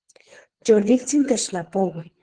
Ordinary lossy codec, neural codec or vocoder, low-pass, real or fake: Opus, 16 kbps; codec, 24 kHz, 3 kbps, HILCodec; 9.9 kHz; fake